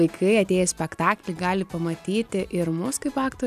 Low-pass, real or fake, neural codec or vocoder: 14.4 kHz; real; none